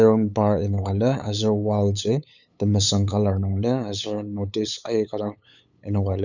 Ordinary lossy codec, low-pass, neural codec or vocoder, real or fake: none; 7.2 kHz; codec, 16 kHz, 8 kbps, FunCodec, trained on LibriTTS, 25 frames a second; fake